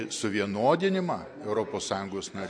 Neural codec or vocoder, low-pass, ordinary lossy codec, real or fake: none; 9.9 kHz; MP3, 48 kbps; real